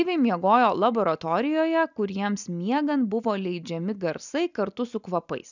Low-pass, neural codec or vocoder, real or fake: 7.2 kHz; none; real